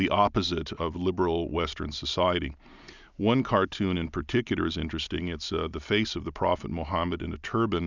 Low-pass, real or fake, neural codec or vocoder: 7.2 kHz; real; none